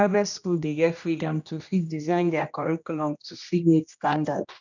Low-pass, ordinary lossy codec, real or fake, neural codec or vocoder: 7.2 kHz; none; fake; codec, 16 kHz, 1 kbps, X-Codec, HuBERT features, trained on general audio